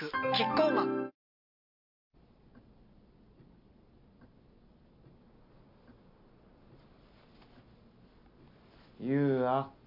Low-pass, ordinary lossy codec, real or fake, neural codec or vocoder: 5.4 kHz; MP3, 48 kbps; real; none